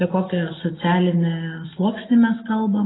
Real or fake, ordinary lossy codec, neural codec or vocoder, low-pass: real; AAC, 16 kbps; none; 7.2 kHz